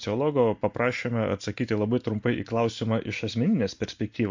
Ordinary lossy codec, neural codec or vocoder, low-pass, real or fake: MP3, 48 kbps; none; 7.2 kHz; real